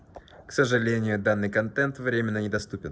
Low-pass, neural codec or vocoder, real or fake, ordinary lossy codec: none; none; real; none